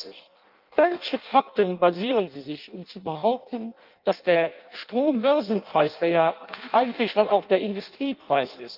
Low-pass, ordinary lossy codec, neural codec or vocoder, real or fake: 5.4 kHz; Opus, 32 kbps; codec, 16 kHz in and 24 kHz out, 0.6 kbps, FireRedTTS-2 codec; fake